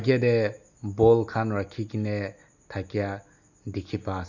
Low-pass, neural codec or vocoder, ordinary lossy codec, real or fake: 7.2 kHz; none; none; real